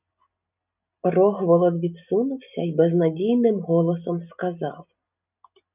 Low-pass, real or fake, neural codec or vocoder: 3.6 kHz; real; none